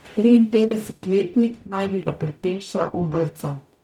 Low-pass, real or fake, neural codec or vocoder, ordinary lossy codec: 19.8 kHz; fake; codec, 44.1 kHz, 0.9 kbps, DAC; none